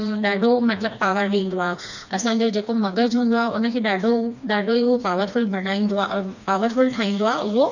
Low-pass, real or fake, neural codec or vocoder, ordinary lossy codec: 7.2 kHz; fake; codec, 16 kHz, 2 kbps, FreqCodec, smaller model; none